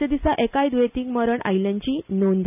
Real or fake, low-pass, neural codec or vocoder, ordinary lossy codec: real; 3.6 kHz; none; none